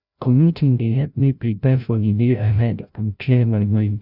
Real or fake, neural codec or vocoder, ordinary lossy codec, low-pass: fake; codec, 16 kHz, 0.5 kbps, FreqCodec, larger model; none; 5.4 kHz